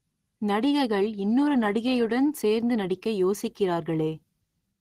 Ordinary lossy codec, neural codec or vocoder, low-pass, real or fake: Opus, 16 kbps; none; 14.4 kHz; real